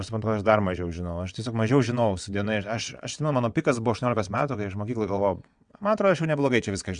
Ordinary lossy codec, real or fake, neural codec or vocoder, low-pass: Opus, 64 kbps; fake; vocoder, 22.05 kHz, 80 mel bands, WaveNeXt; 9.9 kHz